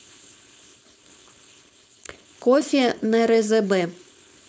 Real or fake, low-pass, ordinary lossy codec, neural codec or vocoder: fake; none; none; codec, 16 kHz, 4.8 kbps, FACodec